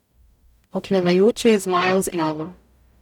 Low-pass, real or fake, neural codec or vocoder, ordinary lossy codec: 19.8 kHz; fake; codec, 44.1 kHz, 0.9 kbps, DAC; none